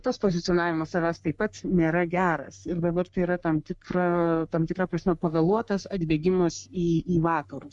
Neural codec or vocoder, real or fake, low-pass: codec, 44.1 kHz, 3.4 kbps, Pupu-Codec; fake; 10.8 kHz